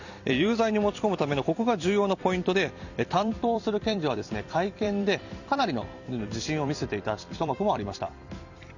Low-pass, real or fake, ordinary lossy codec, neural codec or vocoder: 7.2 kHz; fake; none; vocoder, 44.1 kHz, 128 mel bands every 256 samples, BigVGAN v2